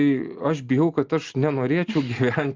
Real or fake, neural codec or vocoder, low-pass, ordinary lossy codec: real; none; 7.2 kHz; Opus, 24 kbps